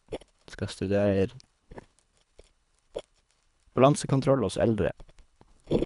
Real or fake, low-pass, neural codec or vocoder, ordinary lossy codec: fake; 10.8 kHz; codec, 24 kHz, 3 kbps, HILCodec; none